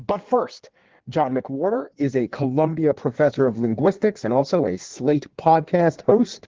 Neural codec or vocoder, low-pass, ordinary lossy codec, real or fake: codec, 16 kHz in and 24 kHz out, 1.1 kbps, FireRedTTS-2 codec; 7.2 kHz; Opus, 16 kbps; fake